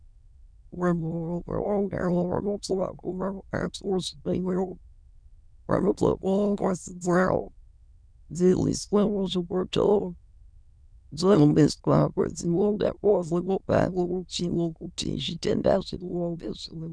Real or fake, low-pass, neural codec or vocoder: fake; 9.9 kHz; autoencoder, 22.05 kHz, a latent of 192 numbers a frame, VITS, trained on many speakers